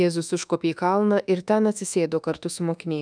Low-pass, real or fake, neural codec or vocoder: 9.9 kHz; fake; codec, 24 kHz, 1.2 kbps, DualCodec